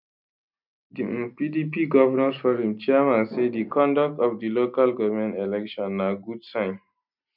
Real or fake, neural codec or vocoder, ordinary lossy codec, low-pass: real; none; none; 5.4 kHz